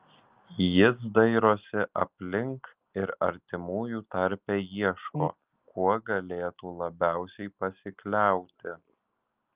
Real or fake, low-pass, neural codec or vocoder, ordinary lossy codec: real; 3.6 kHz; none; Opus, 32 kbps